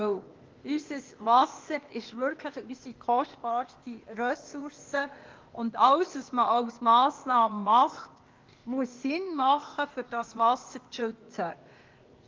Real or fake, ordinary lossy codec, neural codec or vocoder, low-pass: fake; Opus, 32 kbps; codec, 16 kHz, 0.8 kbps, ZipCodec; 7.2 kHz